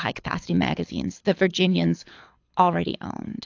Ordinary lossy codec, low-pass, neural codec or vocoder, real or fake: AAC, 48 kbps; 7.2 kHz; codec, 24 kHz, 6 kbps, HILCodec; fake